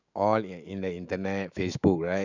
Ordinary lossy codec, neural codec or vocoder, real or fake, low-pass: none; autoencoder, 48 kHz, 128 numbers a frame, DAC-VAE, trained on Japanese speech; fake; 7.2 kHz